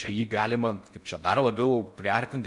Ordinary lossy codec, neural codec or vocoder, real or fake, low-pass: MP3, 96 kbps; codec, 16 kHz in and 24 kHz out, 0.6 kbps, FocalCodec, streaming, 4096 codes; fake; 10.8 kHz